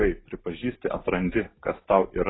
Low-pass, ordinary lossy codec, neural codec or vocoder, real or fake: 7.2 kHz; AAC, 16 kbps; none; real